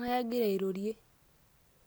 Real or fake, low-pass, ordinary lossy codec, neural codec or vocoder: real; none; none; none